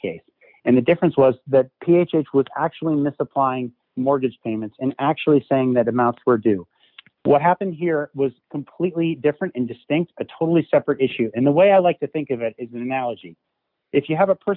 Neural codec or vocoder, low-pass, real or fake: none; 5.4 kHz; real